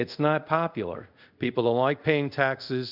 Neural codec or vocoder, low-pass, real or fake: codec, 24 kHz, 0.5 kbps, DualCodec; 5.4 kHz; fake